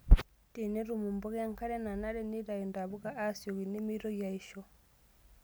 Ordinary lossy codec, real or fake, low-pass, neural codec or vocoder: none; real; none; none